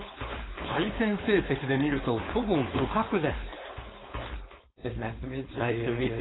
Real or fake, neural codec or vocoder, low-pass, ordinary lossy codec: fake; codec, 16 kHz, 4.8 kbps, FACodec; 7.2 kHz; AAC, 16 kbps